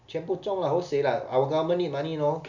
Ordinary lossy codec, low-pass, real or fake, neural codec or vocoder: none; 7.2 kHz; real; none